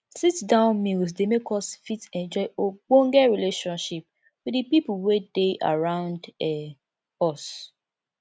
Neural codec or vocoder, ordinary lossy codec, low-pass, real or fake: none; none; none; real